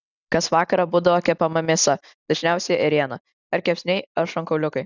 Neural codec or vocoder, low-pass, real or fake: none; 7.2 kHz; real